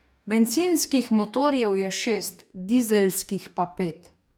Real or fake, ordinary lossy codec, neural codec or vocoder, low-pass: fake; none; codec, 44.1 kHz, 2.6 kbps, DAC; none